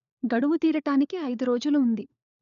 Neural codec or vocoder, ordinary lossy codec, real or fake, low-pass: codec, 16 kHz, 4 kbps, FunCodec, trained on LibriTTS, 50 frames a second; none; fake; 7.2 kHz